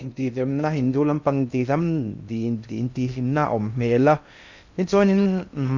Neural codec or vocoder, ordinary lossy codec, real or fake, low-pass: codec, 16 kHz in and 24 kHz out, 0.6 kbps, FocalCodec, streaming, 2048 codes; none; fake; 7.2 kHz